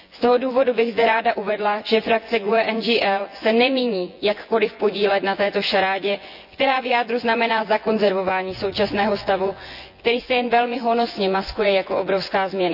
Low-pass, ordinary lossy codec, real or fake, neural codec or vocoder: 5.4 kHz; none; fake; vocoder, 24 kHz, 100 mel bands, Vocos